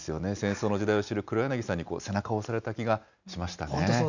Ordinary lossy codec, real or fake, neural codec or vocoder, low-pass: none; real; none; 7.2 kHz